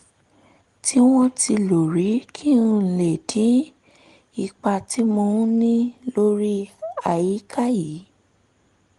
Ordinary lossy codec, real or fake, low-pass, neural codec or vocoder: Opus, 24 kbps; real; 10.8 kHz; none